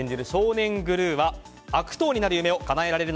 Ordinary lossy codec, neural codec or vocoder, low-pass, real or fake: none; none; none; real